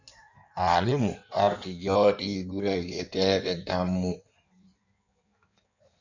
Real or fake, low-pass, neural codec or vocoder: fake; 7.2 kHz; codec, 16 kHz in and 24 kHz out, 1.1 kbps, FireRedTTS-2 codec